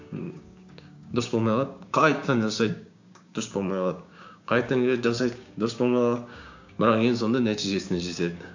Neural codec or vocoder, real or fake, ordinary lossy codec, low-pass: codec, 16 kHz, 6 kbps, DAC; fake; AAC, 48 kbps; 7.2 kHz